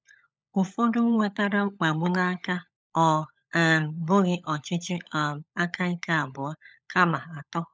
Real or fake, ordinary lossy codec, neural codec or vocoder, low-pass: fake; none; codec, 16 kHz, 16 kbps, FunCodec, trained on LibriTTS, 50 frames a second; none